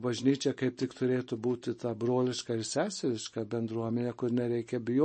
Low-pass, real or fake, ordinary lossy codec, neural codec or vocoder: 10.8 kHz; real; MP3, 32 kbps; none